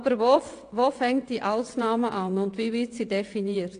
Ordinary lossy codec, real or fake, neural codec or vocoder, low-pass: AAC, 48 kbps; fake; vocoder, 22.05 kHz, 80 mel bands, WaveNeXt; 9.9 kHz